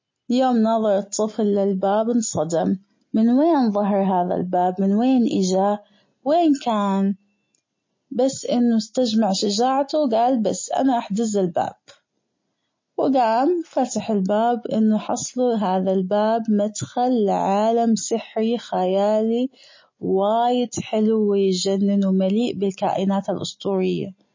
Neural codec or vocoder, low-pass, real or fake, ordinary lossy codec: none; 7.2 kHz; real; MP3, 32 kbps